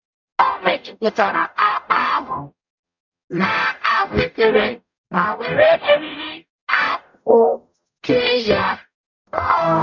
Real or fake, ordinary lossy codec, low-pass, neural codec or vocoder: fake; none; 7.2 kHz; codec, 44.1 kHz, 0.9 kbps, DAC